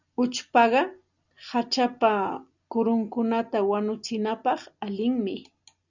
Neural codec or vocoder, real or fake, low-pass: none; real; 7.2 kHz